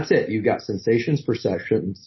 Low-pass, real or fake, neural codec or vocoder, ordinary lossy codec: 7.2 kHz; real; none; MP3, 24 kbps